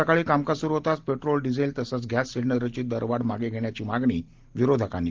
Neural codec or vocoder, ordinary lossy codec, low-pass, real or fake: none; Opus, 16 kbps; 7.2 kHz; real